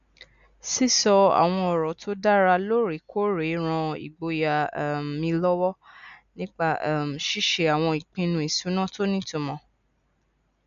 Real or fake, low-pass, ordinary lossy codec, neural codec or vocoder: real; 7.2 kHz; none; none